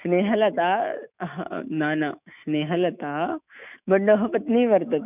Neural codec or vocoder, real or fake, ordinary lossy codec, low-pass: autoencoder, 48 kHz, 128 numbers a frame, DAC-VAE, trained on Japanese speech; fake; none; 3.6 kHz